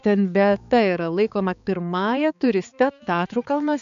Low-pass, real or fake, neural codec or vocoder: 7.2 kHz; fake; codec, 16 kHz, 4 kbps, X-Codec, HuBERT features, trained on balanced general audio